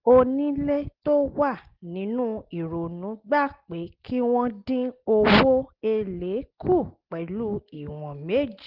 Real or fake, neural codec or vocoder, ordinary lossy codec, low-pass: real; none; Opus, 16 kbps; 5.4 kHz